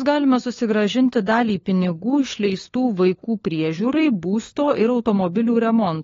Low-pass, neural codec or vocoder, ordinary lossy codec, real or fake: 7.2 kHz; codec, 16 kHz, 4 kbps, FunCodec, trained on LibriTTS, 50 frames a second; AAC, 32 kbps; fake